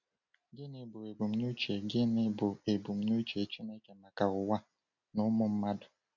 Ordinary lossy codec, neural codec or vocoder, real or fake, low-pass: none; none; real; 7.2 kHz